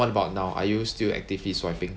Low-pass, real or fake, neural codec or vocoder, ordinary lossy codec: none; real; none; none